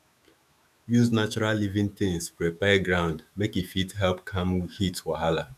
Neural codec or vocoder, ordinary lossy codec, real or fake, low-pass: autoencoder, 48 kHz, 128 numbers a frame, DAC-VAE, trained on Japanese speech; none; fake; 14.4 kHz